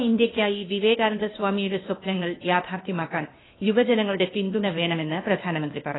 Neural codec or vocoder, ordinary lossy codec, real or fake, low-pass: codec, 16 kHz, 0.8 kbps, ZipCodec; AAC, 16 kbps; fake; 7.2 kHz